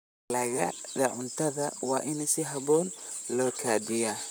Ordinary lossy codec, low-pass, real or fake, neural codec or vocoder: none; none; fake; vocoder, 44.1 kHz, 128 mel bands, Pupu-Vocoder